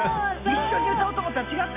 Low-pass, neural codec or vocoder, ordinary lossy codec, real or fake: 3.6 kHz; none; none; real